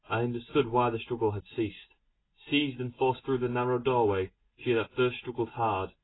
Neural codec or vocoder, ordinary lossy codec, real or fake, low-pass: none; AAC, 16 kbps; real; 7.2 kHz